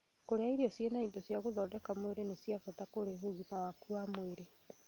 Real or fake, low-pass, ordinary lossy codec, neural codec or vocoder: real; 19.8 kHz; Opus, 32 kbps; none